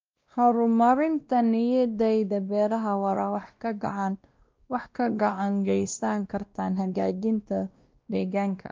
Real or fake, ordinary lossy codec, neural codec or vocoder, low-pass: fake; Opus, 24 kbps; codec, 16 kHz, 1 kbps, X-Codec, WavLM features, trained on Multilingual LibriSpeech; 7.2 kHz